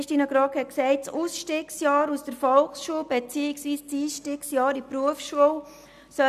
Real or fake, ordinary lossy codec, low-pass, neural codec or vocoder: real; none; 14.4 kHz; none